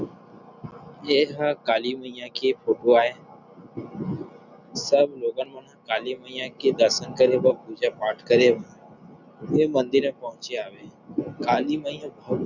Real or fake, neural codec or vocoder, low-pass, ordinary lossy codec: real; none; 7.2 kHz; none